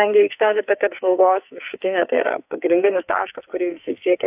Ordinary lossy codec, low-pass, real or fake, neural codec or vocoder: AAC, 32 kbps; 3.6 kHz; fake; codec, 44.1 kHz, 2.6 kbps, SNAC